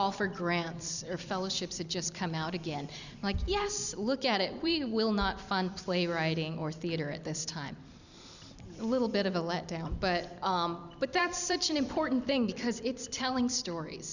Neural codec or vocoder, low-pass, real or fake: vocoder, 44.1 kHz, 128 mel bands every 256 samples, BigVGAN v2; 7.2 kHz; fake